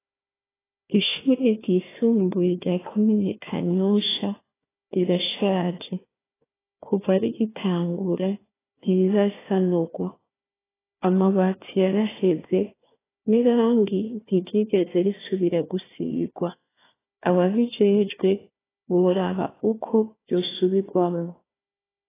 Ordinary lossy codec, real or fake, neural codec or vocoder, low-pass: AAC, 16 kbps; fake; codec, 16 kHz, 1 kbps, FunCodec, trained on Chinese and English, 50 frames a second; 3.6 kHz